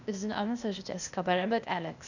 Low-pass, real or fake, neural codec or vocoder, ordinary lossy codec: 7.2 kHz; fake; codec, 16 kHz, 0.8 kbps, ZipCodec; none